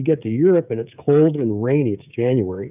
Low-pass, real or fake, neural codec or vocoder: 3.6 kHz; fake; codec, 16 kHz, 16 kbps, FreqCodec, smaller model